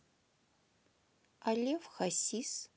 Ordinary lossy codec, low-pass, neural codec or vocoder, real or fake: none; none; none; real